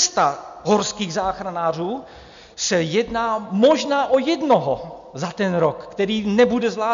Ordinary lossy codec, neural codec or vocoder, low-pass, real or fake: MP3, 64 kbps; none; 7.2 kHz; real